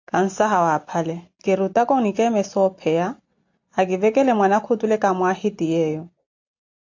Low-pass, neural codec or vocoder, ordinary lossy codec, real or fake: 7.2 kHz; none; AAC, 48 kbps; real